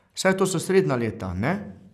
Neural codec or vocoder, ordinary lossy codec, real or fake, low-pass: none; none; real; 14.4 kHz